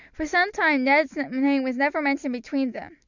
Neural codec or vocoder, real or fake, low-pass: none; real; 7.2 kHz